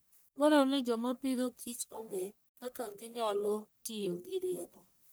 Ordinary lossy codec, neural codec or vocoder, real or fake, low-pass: none; codec, 44.1 kHz, 1.7 kbps, Pupu-Codec; fake; none